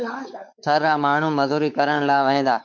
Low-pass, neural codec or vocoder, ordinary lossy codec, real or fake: 7.2 kHz; codec, 16 kHz, 4 kbps, X-Codec, WavLM features, trained on Multilingual LibriSpeech; MP3, 64 kbps; fake